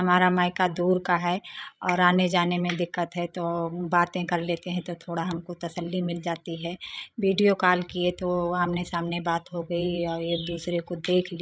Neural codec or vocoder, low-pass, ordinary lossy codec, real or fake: codec, 16 kHz, 16 kbps, FreqCodec, larger model; none; none; fake